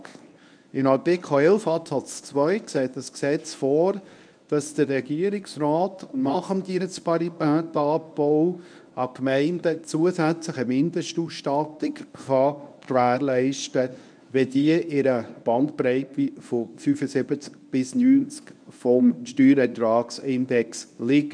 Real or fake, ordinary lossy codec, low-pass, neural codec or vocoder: fake; none; 9.9 kHz; codec, 24 kHz, 0.9 kbps, WavTokenizer, medium speech release version 1